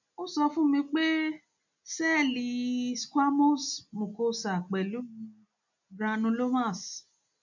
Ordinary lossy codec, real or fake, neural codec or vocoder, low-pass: none; real; none; 7.2 kHz